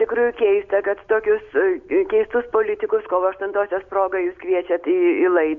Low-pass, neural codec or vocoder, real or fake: 7.2 kHz; none; real